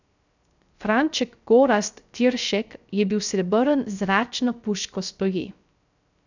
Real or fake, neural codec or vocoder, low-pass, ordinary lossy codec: fake; codec, 16 kHz, 0.3 kbps, FocalCodec; 7.2 kHz; none